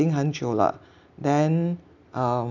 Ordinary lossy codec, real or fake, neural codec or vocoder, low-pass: none; real; none; 7.2 kHz